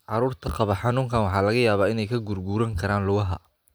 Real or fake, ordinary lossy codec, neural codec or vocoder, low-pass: real; none; none; none